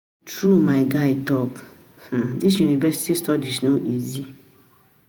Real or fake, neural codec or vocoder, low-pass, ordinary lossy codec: fake; vocoder, 48 kHz, 128 mel bands, Vocos; none; none